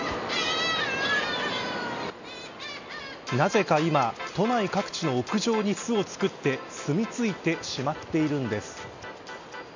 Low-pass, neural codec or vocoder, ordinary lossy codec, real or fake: 7.2 kHz; none; none; real